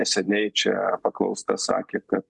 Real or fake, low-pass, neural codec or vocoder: real; 10.8 kHz; none